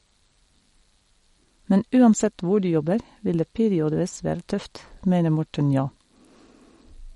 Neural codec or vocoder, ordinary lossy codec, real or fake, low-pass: none; MP3, 48 kbps; real; 19.8 kHz